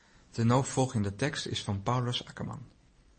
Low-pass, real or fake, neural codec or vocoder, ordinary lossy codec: 10.8 kHz; real; none; MP3, 32 kbps